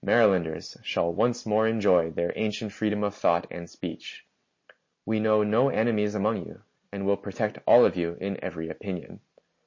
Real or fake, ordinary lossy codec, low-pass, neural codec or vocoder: real; MP3, 32 kbps; 7.2 kHz; none